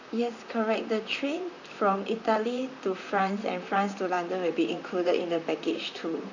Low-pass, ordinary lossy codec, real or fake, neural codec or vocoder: 7.2 kHz; none; fake; vocoder, 44.1 kHz, 128 mel bands, Pupu-Vocoder